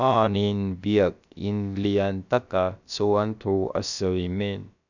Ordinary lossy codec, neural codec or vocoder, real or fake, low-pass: none; codec, 16 kHz, about 1 kbps, DyCAST, with the encoder's durations; fake; 7.2 kHz